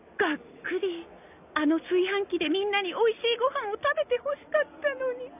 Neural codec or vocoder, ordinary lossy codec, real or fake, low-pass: none; none; real; 3.6 kHz